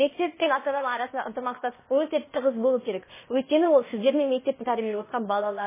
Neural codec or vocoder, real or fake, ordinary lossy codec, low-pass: codec, 16 kHz, 0.8 kbps, ZipCodec; fake; MP3, 16 kbps; 3.6 kHz